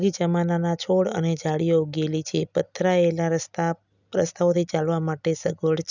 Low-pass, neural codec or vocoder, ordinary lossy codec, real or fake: 7.2 kHz; none; none; real